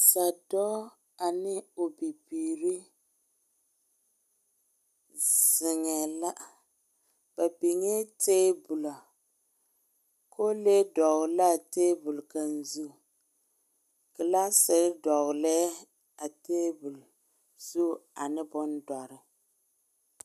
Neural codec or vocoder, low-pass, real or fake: none; 14.4 kHz; real